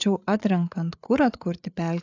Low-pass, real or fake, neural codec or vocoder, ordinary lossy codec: 7.2 kHz; fake; codec, 16 kHz, 8 kbps, FreqCodec, larger model; AAC, 48 kbps